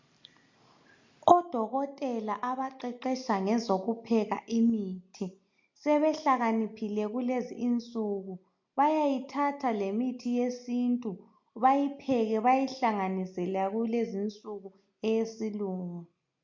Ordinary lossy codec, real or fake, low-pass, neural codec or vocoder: MP3, 48 kbps; real; 7.2 kHz; none